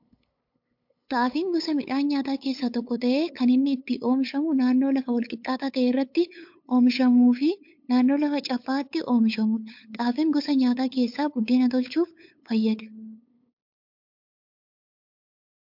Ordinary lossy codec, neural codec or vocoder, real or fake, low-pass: MP3, 48 kbps; codec, 16 kHz, 8 kbps, FunCodec, trained on LibriTTS, 25 frames a second; fake; 5.4 kHz